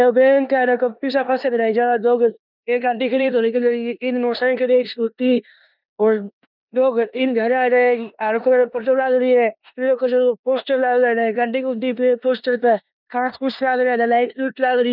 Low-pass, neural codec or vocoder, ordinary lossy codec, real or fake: 5.4 kHz; codec, 16 kHz in and 24 kHz out, 0.9 kbps, LongCat-Audio-Codec, four codebook decoder; none; fake